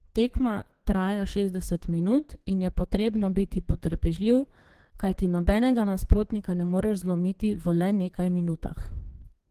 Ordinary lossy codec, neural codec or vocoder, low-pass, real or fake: Opus, 16 kbps; codec, 32 kHz, 1.9 kbps, SNAC; 14.4 kHz; fake